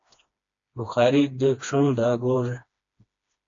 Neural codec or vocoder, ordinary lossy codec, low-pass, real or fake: codec, 16 kHz, 2 kbps, FreqCodec, smaller model; AAC, 48 kbps; 7.2 kHz; fake